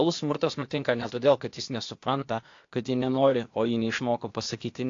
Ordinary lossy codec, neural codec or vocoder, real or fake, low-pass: AAC, 48 kbps; codec, 16 kHz, 0.8 kbps, ZipCodec; fake; 7.2 kHz